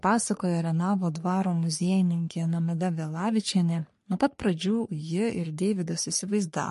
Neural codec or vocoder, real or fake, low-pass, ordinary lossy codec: codec, 44.1 kHz, 3.4 kbps, Pupu-Codec; fake; 14.4 kHz; MP3, 48 kbps